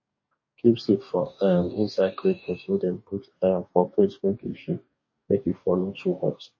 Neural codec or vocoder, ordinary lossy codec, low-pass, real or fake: codec, 44.1 kHz, 2.6 kbps, DAC; MP3, 32 kbps; 7.2 kHz; fake